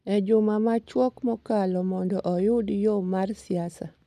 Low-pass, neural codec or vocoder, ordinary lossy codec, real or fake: 14.4 kHz; none; none; real